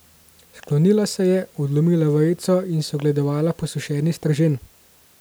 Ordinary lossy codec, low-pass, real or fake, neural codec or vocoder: none; none; real; none